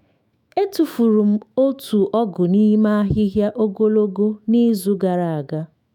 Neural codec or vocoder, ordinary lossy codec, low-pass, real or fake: autoencoder, 48 kHz, 128 numbers a frame, DAC-VAE, trained on Japanese speech; none; 19.8 kHz; fake